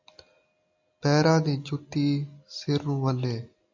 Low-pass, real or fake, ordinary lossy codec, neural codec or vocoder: 7.2 kHz; real; AAC, 48 kbps; none